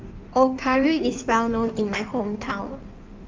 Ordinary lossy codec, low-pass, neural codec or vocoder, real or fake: Opus, 24 kbps; 7.2 kHz; codec, 16 kHz in and 24 kHz out, 1.1 kbps, FireRedTTS-2 codec; fake